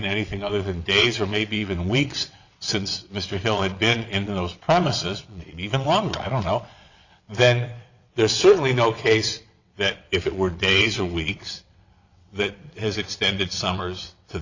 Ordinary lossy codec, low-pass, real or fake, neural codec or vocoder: Opus, 64 kbps; 7.2 kHz; fake; vocoder, 22.05 kHz, 80 mel bands, WaveNeXt